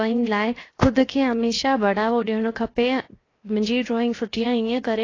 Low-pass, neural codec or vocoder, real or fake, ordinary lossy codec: 7.2 kHz; codec, 16 kHz, 0.7 kbps, FocalCodec; fake; AAC, 32 kbps